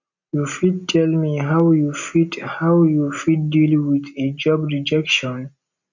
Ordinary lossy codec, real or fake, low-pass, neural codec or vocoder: none; real; 7.2 kHz; none